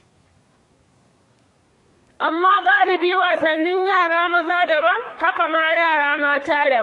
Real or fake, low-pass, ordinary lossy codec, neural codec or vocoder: fake; 10.8 kHz; AAC, 48 kbps; codec, 24 kHz, 1 kbps, SNAC